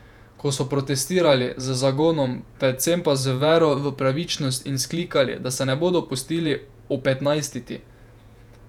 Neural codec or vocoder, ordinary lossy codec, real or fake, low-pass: vocoder, 48 kHz, 128 mel bands, Vocos; none; fake; 19.8 kHz